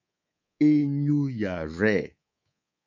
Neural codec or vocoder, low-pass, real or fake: codec, 24 kHz, 3.1 kbps, DualCodec; 7.2 kHz; fake